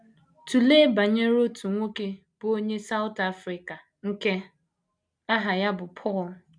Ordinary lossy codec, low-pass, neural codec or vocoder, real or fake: none; 9.9 kHz; none; real